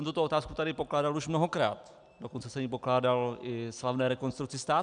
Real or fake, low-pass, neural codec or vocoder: real; 9.9 kHz; none